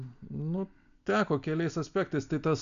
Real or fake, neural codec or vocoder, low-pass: real; none; 7.2 kHz